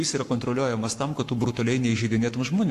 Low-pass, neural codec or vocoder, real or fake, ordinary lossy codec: 14.4 kHz; codec, 44.1 kHz, 7.8 kbps, Pupu-Codec; fake; AAC, 64 kbps